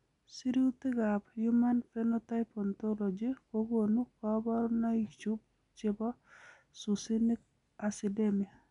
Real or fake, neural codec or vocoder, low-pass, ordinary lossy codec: real; none; 10.8 kHz; none